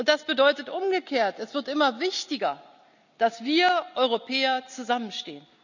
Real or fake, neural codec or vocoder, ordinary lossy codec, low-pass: real; none; none; 7.2 kHz